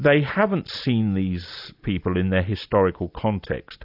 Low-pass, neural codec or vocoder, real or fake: 5.4 kHz; none; real